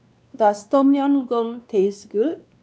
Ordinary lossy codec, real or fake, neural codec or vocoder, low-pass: none; fake; codec, 16 kHz, 2 kbps, X-Codec, WavLM features, trained on Multilingual LibriSpeech; none